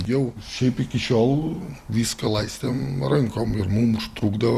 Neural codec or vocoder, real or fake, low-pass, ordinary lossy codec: none; real; 14.4 kHz; Opus, 64 kbps